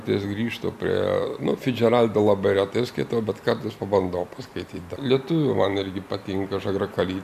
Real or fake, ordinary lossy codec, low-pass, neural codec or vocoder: real; MP3, 96 kbps; 14.4 kHz; none